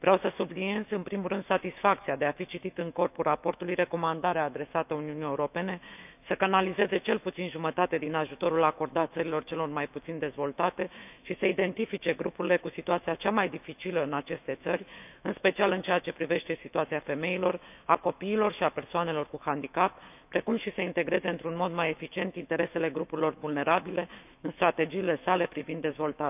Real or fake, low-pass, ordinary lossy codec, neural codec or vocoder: fake; 3.6 kHz; none; autoencoder, 48 kHz, 128 numbers a frame, DAC-VAE, trained on Japanese speech